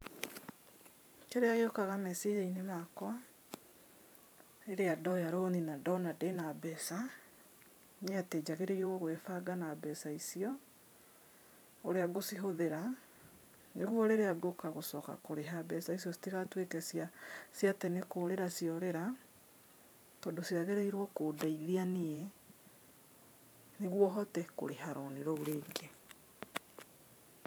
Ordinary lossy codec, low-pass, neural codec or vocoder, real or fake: none; none; vocoder, 44.1 kHz, 128 mel bands every 512 samples, BigVGAN v2; fake